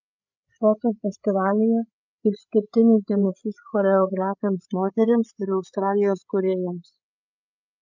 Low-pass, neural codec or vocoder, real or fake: 7.2 kHz; codec, 16 kHz, 8 kbps, FreqCodec, larger model; fake